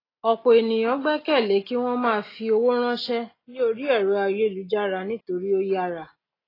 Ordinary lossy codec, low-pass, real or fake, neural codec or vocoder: AAC, 24 kbps; 5.4 kHz; real; none